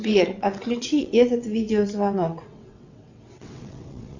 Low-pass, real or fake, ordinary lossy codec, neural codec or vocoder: 7.2 kHz; fake; Opus, 64 kbps; vocoder, 22.05 kHz, 80 mel bands, WaveNeXt